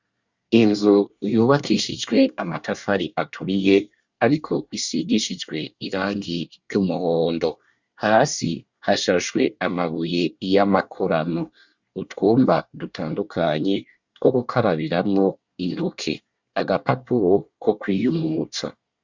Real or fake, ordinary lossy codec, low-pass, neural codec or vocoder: fake; Opus, 64 kbps; 7.2 kHz; codec, 24 kHz, 1 kbps, SNAC